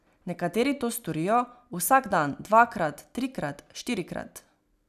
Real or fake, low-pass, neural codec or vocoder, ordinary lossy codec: real; 14.4 kHz; none; none